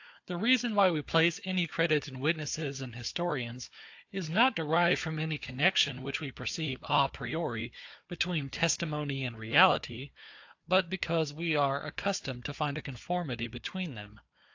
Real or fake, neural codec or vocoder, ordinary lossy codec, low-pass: fake; codec, 16 kHz, 4 kbps, FunCodec, trained on Chinese and English, 50 frames a second; AAC, 48 kbps; 7.2 kHz